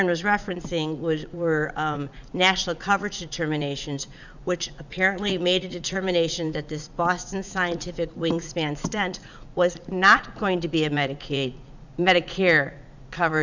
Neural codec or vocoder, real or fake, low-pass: vocoder, 44.1 kHz, 80 mel bands, Vocos; fake; 7.2 kHz